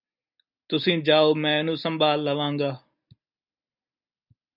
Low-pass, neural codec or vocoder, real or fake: 5.4 kHz; none; real